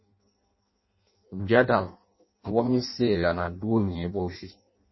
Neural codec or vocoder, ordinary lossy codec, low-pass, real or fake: codec, 16 kHz in and 24 kHz out, 0.6 kbps, FireRedTTS-2 codec; MP3, 24 kbps; 7.2 kHz; fake